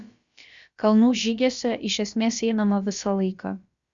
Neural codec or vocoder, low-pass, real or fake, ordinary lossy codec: codec, 16 kHz, about 1 kbps, DyCAST, with the encoder's durations; 7.2 kHz; fake; Opus, 64 kbps